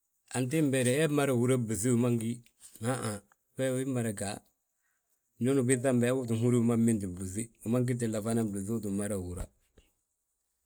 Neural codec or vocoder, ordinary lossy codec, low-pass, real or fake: none; none; none; real